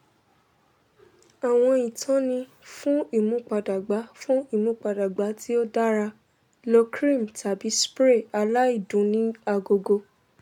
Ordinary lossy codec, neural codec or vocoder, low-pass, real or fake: none; none; 19.8 kHz; real